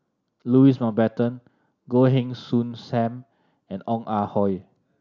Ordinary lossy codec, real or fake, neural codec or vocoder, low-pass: none; real; none; 7.2 kHz